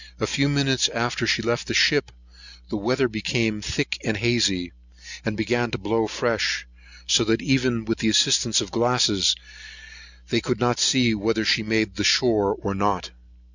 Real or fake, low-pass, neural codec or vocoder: real; 7.2 kHz; none